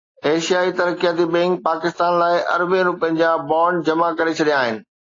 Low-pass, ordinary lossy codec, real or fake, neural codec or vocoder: 7.2 kHz; AAC, 32 kbps; real; none